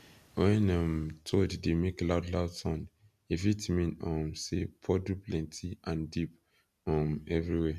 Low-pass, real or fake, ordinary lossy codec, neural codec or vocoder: 14.4 kHz; real; none; none